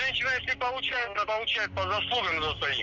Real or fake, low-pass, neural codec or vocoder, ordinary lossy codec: real; 7.2 kHz; none; none